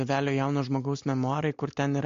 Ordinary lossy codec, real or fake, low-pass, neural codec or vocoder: MP3, 48 kbps; real; 7.2 kHz; none